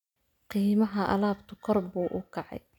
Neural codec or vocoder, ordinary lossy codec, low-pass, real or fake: none; none; 19.8 kHz; real